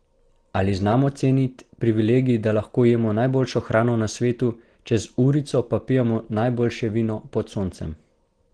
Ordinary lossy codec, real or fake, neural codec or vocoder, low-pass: Opus, 16 kbps; real; none; 9.9 kHz